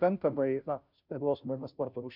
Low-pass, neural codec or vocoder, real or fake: 5.4 kHz; codec, 16 kHz, 0.5 kbps, FunCodec, trained on Chinese and English, 25 frames a second; fake